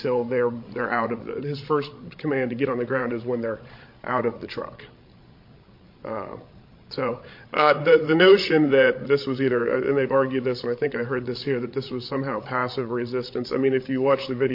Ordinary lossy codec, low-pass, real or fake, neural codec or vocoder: MP3, 32 kbps; 5.4 kHz; fake; codec, 16 kHz, 16 kbps, FreqCodec, larger model